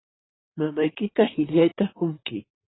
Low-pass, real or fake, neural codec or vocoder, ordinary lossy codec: 7.2 kHz; fake; vocoder, 44.1 kHz, 128 mel bands, Pupu-Vocoder; AAC, 16 kbps